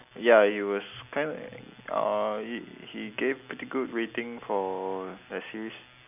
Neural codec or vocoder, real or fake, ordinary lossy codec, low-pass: none; real; none; 3.6 kHz